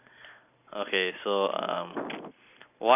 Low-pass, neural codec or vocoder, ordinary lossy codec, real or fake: 3.6 kHz; none; none; real